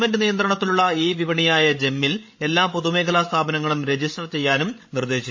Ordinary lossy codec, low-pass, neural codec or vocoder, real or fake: MP3, 32 kbps; 7.2 kHz; codec, 16 kHz, 16 kbps, FreqCodec, larger model; fake